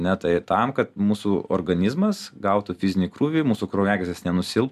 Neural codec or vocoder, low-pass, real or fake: none; 14.4 kHz; real